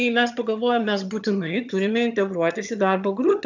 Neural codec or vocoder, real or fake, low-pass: vocoder, 22.05 kHz, 80 mel bands, HiFi-GAN; fake; 7.2 kHz